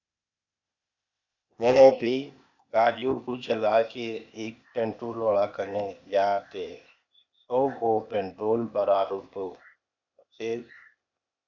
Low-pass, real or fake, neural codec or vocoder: 7.2 kHz; fake; codec, 16 kHz, 0.8 kbps, ZipCodec